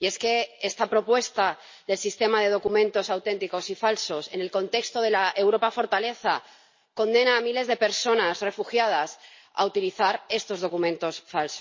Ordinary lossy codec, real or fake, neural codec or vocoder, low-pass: MP3, 32 kbps; real; none; 7.2 kHz